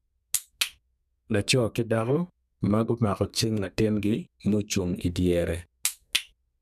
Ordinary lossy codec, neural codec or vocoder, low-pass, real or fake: none; codec, 32 kHz, 1.9 kbps, SNAC; 14.4 kHz; fake